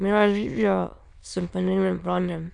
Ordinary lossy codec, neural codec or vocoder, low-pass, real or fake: none; autoencoder, 22.05 kHz, a latent of 192 numbers a frame, VITS, trained on many speakers; 9.9 kHz; fake